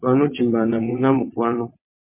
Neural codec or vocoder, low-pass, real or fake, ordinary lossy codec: vocoder, 22.05 kHz, 80 mel bands, WaveNeXt; 3.6 kHz; fake; MP3, 24 kbps